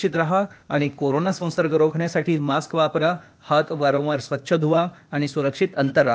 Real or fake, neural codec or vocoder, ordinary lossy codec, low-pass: fake; codec, 16 kHz, 0.8 kbps, ZipCodec; none; none